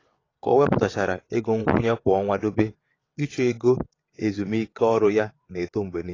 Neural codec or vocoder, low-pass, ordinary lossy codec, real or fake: vocoder, 22.05 kHz, 80 mel bands, WaveNeXt; 7.2 kHz; AAC, 32 kbps; fake